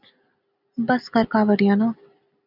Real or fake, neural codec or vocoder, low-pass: real; none; 5.4 kHz